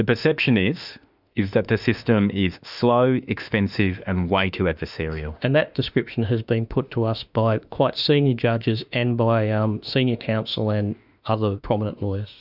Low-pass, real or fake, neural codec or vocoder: 5.4 kHz; fake; autoencoder, 48 kHz, 32 numbers a frame, DAC-VAE, trained on Japanese speech